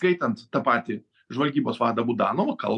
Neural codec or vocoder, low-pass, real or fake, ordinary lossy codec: none; 10.8 kHz; real; MP3, 96 kbps